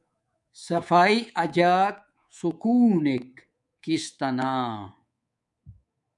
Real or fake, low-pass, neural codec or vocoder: fake; 10.8 kHz; codec, 24 kHz, 3.1 kbps, DualCodec